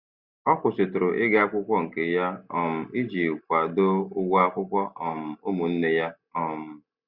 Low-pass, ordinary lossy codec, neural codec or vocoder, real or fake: 5.4 kHz; Opus, 64 kbps; none; real